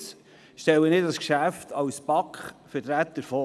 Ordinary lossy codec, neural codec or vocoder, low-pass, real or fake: none; none; none; real